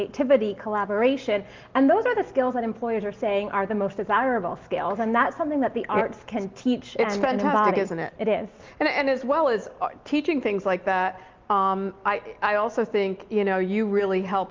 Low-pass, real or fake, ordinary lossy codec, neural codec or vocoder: 7.2 kHz; real; Opus, 32 kbps; none